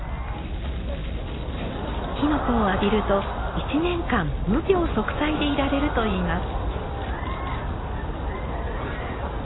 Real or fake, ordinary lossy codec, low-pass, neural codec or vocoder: real; AAC, 16 kbps; 7.2 kHz; none